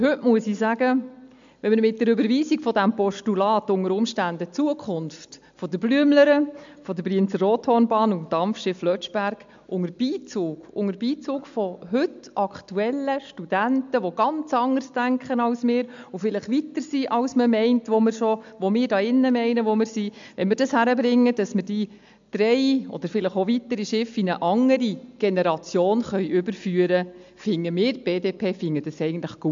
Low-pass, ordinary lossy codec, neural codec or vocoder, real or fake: 7.2 kHz; MP3, 64 kbps; none; real